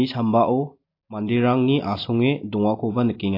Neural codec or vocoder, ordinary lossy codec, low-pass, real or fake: none; AAC, 32 kbps; 5.4 kHz; real